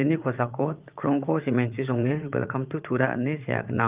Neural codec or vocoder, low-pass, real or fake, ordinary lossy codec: vocoder, 44.1 kHz, 80 mel bands, Vocos; 3.6 kHz; fake; Opus, 32 kbps